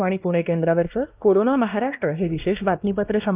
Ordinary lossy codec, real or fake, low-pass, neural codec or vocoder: Opus, 24 kbps; fake; 3.6 kHz; codec, 16 kHz, 1 kbps, X-Codec, WavLM features, trained on Multilingual LibriSpeech